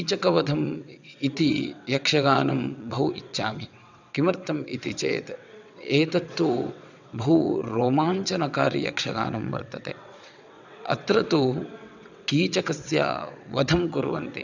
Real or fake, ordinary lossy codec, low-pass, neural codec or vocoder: fake; none; 7.2 kHz; vocoder, 22.05 kHz, 80 mel bands, WaveNeXt